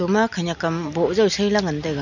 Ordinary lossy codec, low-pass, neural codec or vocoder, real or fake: none; 7.2 kHz; none; real